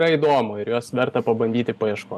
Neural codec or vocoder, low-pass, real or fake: none; 14.4 kHz; real